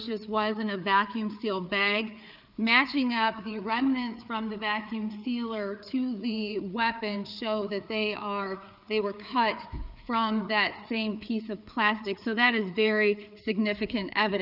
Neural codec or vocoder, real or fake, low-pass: codec, 16 kHz, 4 kbps, FreqCodec, larger model; fake; 5.4 kHz